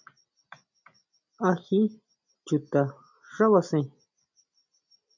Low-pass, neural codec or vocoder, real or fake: 7.2 kHz; none; real